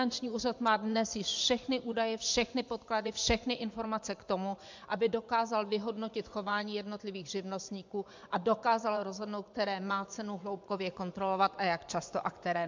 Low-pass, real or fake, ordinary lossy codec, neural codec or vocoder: 7.2 kHz; fake; MP3, 64 kbps; vocoder, 24 kHz, 100 mel bands, Vocos